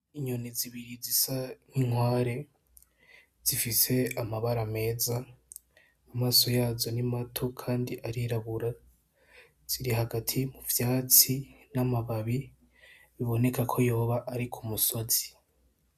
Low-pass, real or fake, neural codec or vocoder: 14.4 kHz; real; none